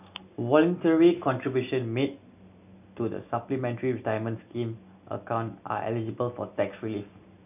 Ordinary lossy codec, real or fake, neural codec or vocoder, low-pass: none; real; none; 3.6 kHz